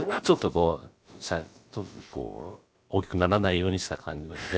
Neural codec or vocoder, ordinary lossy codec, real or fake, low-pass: codec, 16 kHz, about 1 kbps, DyCAST, with the encoder's durations; none; fake; none